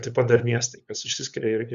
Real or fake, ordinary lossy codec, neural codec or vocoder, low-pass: fake; Opus, 64 kbps; codec, 16 kHz, 8 kbps, FunCodec, trained on LibriTTS, 25 frames a second; 7.2 kHz